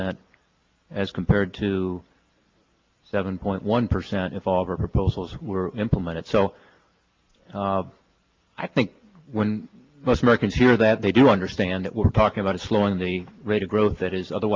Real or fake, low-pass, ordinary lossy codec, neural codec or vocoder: real; 7.2 kHz; Opus, 32 kbps; none